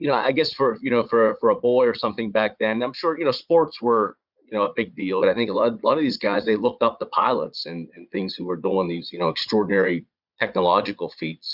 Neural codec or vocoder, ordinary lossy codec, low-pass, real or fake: vocoder, 22.05 kHz, 80 mel bands, Vocos; Opus, 64 kbps; 5.4 kHz; fake